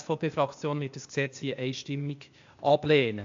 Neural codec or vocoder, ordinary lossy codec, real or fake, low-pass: codec, 16 kHz, 0.8 kbps, ZipCodec; AAC, 64 kbps; fake; 7.2 kHz